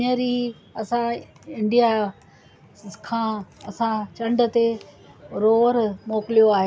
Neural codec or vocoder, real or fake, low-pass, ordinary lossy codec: none; real; none; none